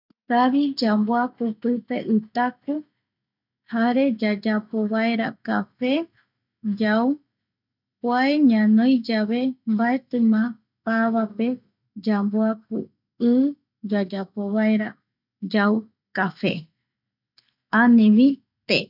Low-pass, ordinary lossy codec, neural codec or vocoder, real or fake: 5.4 kHz; none; none; real